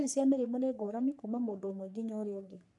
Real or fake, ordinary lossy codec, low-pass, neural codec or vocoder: fake; none; 10.8 kHz; codec, 44.1 kHz, 3.4 kbps, Pupu-Codec